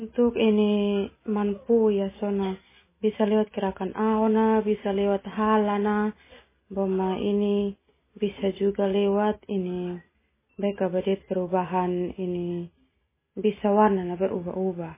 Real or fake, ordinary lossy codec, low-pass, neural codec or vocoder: real; MP3, 16 kbps; 3.6 kHz; none